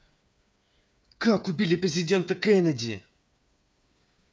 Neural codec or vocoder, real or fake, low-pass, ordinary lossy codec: codec, 16 kHz, 8 kbps, FreqCodec, smaller model; fake; none; none